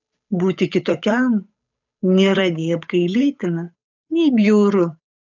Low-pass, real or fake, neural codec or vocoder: 7.2 kHz; fake; codec, 16 kHz, 8 kbps, FunCodec, trained on Chinese and English, 25 frames a second